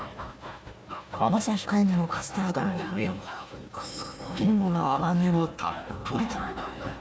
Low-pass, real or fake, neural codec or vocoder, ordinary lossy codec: none; fake; codec, 16 kHz, 1 kbps, FunCodec, trained on Chinese and English, 50 frames a second; none